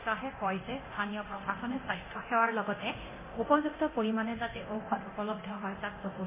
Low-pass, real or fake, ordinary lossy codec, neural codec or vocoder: 3.6 kHz; fake; MP3, 16 kbps; codec, 24 kHz, 0.9 kbps, DualCodec